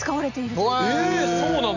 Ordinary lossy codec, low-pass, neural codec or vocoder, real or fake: none; 7.2 kHz; none; real